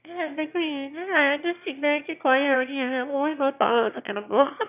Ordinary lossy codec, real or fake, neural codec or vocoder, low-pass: none; fake; autoencoder, 22.05 kHz, a latent of 192 numbers a frame, VITS, trained on one speaker; 3.6 kHz